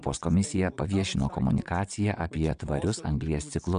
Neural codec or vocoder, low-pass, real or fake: vocoder, 22.05 kHz, 80 mel bands, WaveNeXt; 9.9 kHz; fake